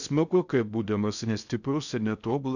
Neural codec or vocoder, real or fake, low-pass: codec, 16 kHz in and 24 kHz out, 0.6 kbps, FocalCodec, streaming, 4096 codes; fake; 7.2 kHz